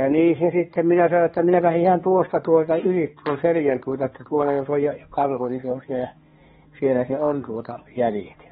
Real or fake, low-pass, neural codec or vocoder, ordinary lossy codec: fake; 7.2 kHz; codec, 16 kHz, 2 kbps, X-Codec, HuBERT features, trained on balanced general audio; AAC, 16 kbps